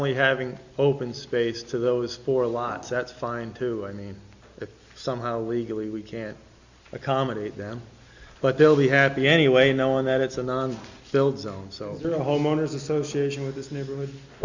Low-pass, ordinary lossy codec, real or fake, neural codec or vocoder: 7.2 kHz; Opus, 64 kbps; real; none